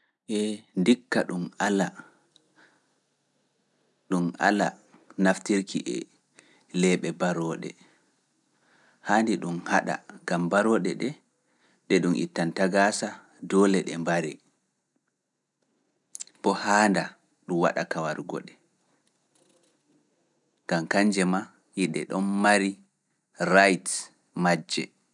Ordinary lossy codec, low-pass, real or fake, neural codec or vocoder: none; 10.8 kHz; real; none